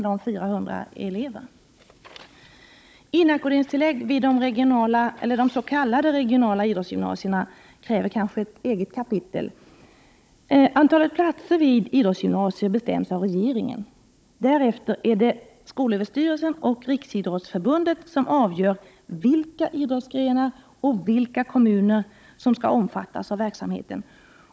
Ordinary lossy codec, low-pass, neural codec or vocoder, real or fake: none; none; codec, 16 kHz, 16 kbps, FunCodec, trained on Chinese and English, 50 frames a second; fake